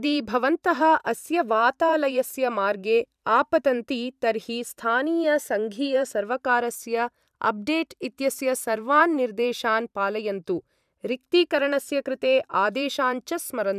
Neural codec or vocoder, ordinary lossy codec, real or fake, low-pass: vocoder, 44.1 kHz, 128 mel bands every 512 samples, BigVGAN v2; none; fake; 14.4 kHz